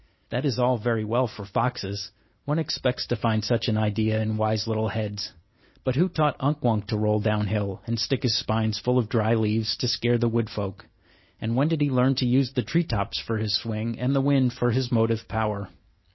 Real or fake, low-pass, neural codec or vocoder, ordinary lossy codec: real; 7.2 kHz; none; MP3, 24 kbps